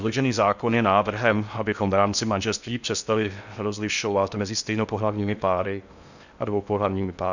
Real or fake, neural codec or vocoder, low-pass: fake; codec, 16 kHz in and 24 kHz out, 0.6 kbps, FocalCodec, streaming, 4096 codes; 7.2 kHz